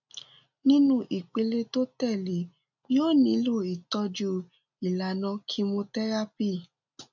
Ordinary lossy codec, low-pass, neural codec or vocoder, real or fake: none; 7.2 kHz; none; real